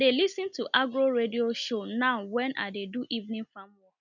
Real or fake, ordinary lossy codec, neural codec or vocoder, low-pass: real; none; none; 7.2 kHz